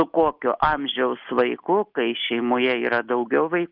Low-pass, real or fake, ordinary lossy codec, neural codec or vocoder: 5.4 kHz; real; Opus, 32 kbps; none